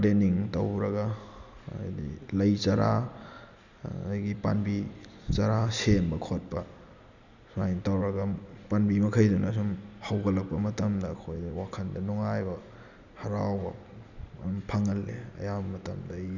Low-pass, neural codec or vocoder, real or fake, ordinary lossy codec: 7.2 kHz; none; real; none